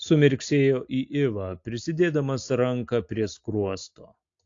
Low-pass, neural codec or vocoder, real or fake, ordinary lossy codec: 7.2 kHz; none; real; AAC, 48 kbps